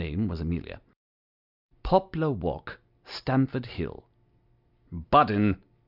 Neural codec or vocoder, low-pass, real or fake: none; 5.4 kHz; real